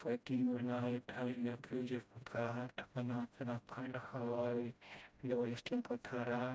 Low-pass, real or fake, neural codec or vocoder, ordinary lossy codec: none; fake; codec, 16 kHz, 0.5 kbps, FreqCodec, smaller model; none